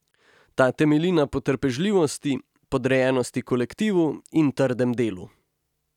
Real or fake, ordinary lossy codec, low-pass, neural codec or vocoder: real; none; 19.8 kHz; none